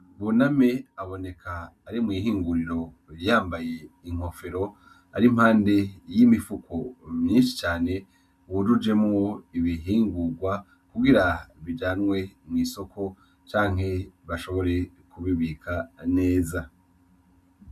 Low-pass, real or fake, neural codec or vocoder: 14.4 kHz; real; none